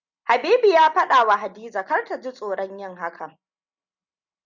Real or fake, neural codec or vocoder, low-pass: real; none; 7.2 kHz